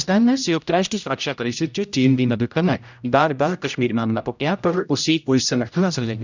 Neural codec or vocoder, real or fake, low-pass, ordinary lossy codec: codec, 16 kHz, 0.5 kbps, X-Codec, HuBERT features, trained on general audio; fake; 7.2 kHz; none